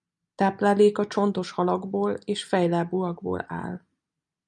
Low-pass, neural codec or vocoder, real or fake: 10.8 kHz; none; real